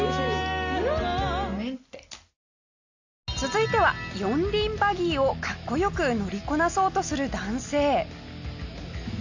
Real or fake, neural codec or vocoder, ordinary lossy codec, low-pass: real; none; AAC, 48 kbps; 7.2 kHz